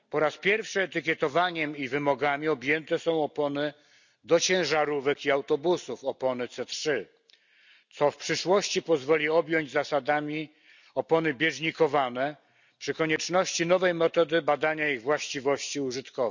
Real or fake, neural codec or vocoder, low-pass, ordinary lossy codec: real; none; 7.2 kHz; none